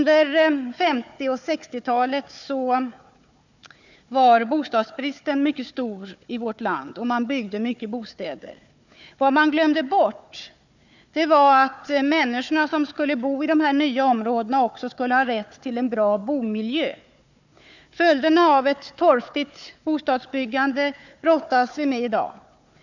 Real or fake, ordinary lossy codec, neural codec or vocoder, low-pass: fake; none; codec, 16 kHz, 4 kbps, FunCodec, trained on Chinese and English, 50 frames a second; 7.2 kHz